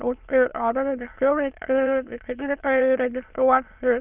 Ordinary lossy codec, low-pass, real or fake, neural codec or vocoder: Opus, 24 kbps; 3.6 kHz; fake; autoencoder, 22.05 kHz, a latent of 192 numbers a frame, VITS, trained on many speakers